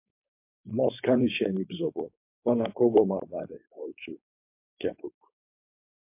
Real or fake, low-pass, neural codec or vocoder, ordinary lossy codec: fake; 3.6 kHz; codec, 16 kHz, 4.8 kbps, FACodec; MP3, 32 kbps